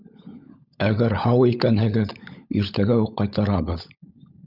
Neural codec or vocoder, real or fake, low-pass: codec, 16 kHz, 16 kbps, FunCodec, trained on LibriTTS, 50 frames a second; fake; 5.4 kHz